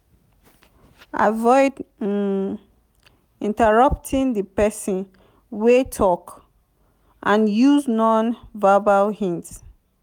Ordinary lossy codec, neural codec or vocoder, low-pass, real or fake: none; none; 19.8 kHz; real